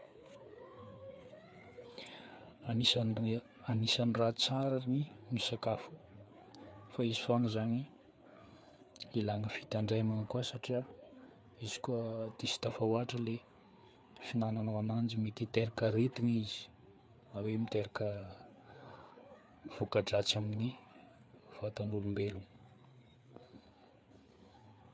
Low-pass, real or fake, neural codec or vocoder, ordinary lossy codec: none; fake; codec, 16 kHz, 4 kbps, FreqCodec, larger model; none